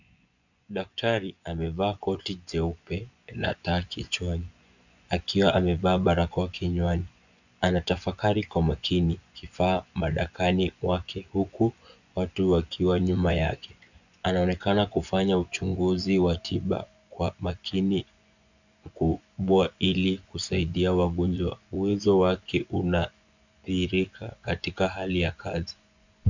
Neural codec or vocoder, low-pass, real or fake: vocoder, 24 kHz, 100 mel bands, Vocos; 7.2 kHz; fake